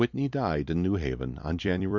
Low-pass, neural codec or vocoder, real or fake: 7.2 kHz; vocoder, 44.1 kHz, 128 mel bands every 256 samples, BigVGAN v2; fake